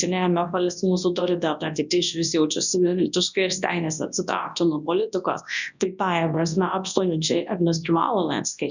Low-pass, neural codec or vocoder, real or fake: 7.2 kHz; codec, 24 kHz, 0.9 kbps, WavTokenizer, large speech release; fake